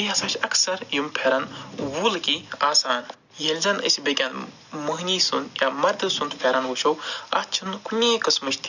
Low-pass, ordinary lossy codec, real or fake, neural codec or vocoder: 7.2 kHz; none; real; none